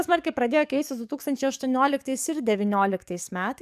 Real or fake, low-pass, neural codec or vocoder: fake; 14.4 kHz; codec, 44.1 kHz, 7.8 kbps, DAC